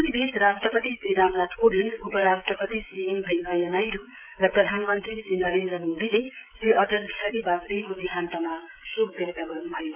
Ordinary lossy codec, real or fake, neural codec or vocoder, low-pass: none; fake; codec, 16 kHz, 16 kbps, FreqCodec, smaller model; 3.6 kHz